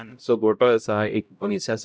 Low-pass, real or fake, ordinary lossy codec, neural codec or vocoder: none; fake; none; codec, 16 kHz, 0.5 kbps, X-Codec, HuBERT features, trained on LibriSpeech